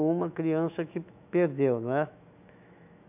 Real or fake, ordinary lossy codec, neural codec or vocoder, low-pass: fake; none; autoencoder, 48 kHz, 128 numbers a frame, DAC-VAE, trained on Japanese speech; 3.6 kHz